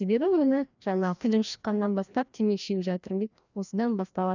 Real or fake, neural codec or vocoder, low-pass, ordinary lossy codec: fake; codec, 16 kHz, 1 kbps, FreqCodec, larger model; 7.2 kHz; none